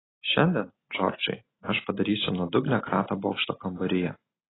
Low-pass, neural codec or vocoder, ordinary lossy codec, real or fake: 7.2 kHz; none; AAC, 16 kbps; real